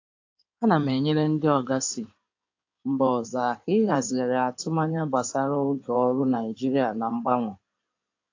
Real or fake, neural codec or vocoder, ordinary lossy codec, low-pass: fake; codec, 16 kHz in and 24 kHz out, 2.2 kbps, FireRedTTS-2 codec; AAC, 48 kbps; 7.2 kHz